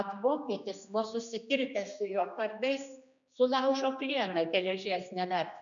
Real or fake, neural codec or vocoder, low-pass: fake; codec, 16 kHz, 2 kbps, X-Codec, HuBERT features, trained on general audio; 7.2 kHz